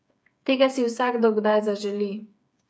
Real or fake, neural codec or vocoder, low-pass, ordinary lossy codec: fake; codec, 16 kHz, 8 kbps, FreqCodec, smaller model; none; none